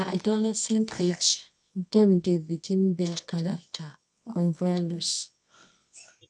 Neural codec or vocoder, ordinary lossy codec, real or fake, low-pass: codec, 24 kHz, 0.9 kbps, WavTokenizer, medium music audio release; none; fake; none